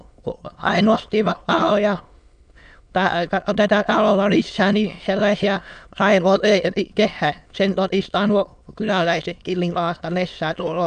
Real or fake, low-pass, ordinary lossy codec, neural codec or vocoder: fake; 9.9 kHz; none; autoencoder, 22.05 kHz, a latent of 192 numbers a frame, VITS, trained on many speakers